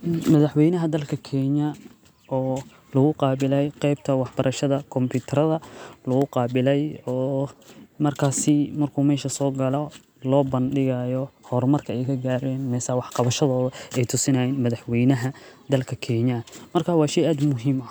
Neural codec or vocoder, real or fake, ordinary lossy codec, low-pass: none; real; none; none